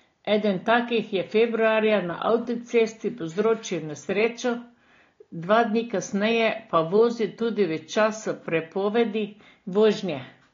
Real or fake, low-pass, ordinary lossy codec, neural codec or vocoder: real; 7.2 kHz; AAC, 32 kbps; none